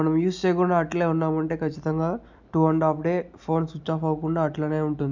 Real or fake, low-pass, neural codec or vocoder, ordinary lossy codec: real; 7.2 kHz; none; none